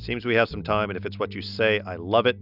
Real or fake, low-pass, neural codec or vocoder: real; 5.4 kHz; none